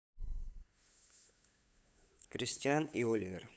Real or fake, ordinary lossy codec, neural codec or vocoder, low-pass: fake; none; codec, 16 kHz, 8 kbps, FunCodec, trained on LibriTTS, 25 frames a second; none